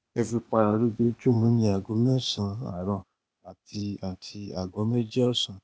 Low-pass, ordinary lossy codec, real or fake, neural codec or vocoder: none; none; fake; codec, 16 kHz, 0.8 kbps, ZipCodec